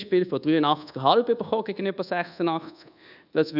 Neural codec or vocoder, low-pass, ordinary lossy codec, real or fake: codec, 24 kHz, 1.2 kbps, DualCodec; 5.4 kHz; none; fake